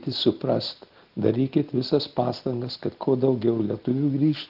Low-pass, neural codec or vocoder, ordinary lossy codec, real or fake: 5.4 kHz; none; Opus, 16 kbps; real